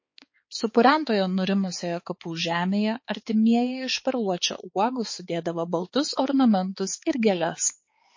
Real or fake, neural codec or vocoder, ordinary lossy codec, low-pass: fake; codec, 16 kHz, 4 kbps, X-Codec, HuBERT features, trained on balanced general audio; MP3, 32 kbps; 7.2 kHz